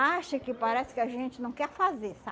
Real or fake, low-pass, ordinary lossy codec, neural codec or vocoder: real; none; none; none